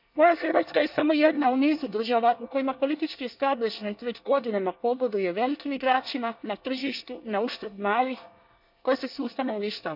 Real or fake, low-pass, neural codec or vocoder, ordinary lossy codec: fake; 5.4 kHz; codec, 24 kHz, 1 kbps, SNAC; none